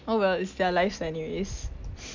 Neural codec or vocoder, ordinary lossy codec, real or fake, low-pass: none; MP3, 64 kbps; real; 7.2 kHz